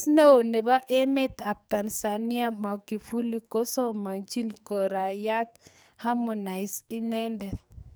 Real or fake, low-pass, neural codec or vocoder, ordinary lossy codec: fake; none; codec, 44.1 kHz, 2.6 kbps, SNAC; none